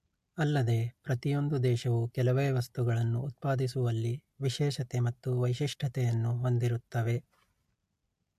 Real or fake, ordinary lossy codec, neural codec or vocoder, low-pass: real; MP3, 64 kbps; none; 14.4 kHz